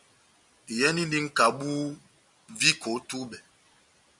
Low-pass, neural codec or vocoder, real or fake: 10.8 kHz; none; real